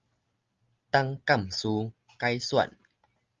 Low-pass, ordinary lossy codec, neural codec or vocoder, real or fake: 7.2 kHz; Opus, 32 kbps; none; real